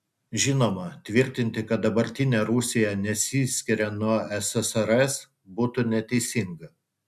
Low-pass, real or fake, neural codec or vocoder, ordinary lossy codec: 14.4 kHz; real; none; MP3, 96 kbps